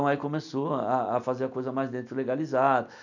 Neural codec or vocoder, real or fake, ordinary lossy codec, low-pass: none; real; none; 7.2 kHz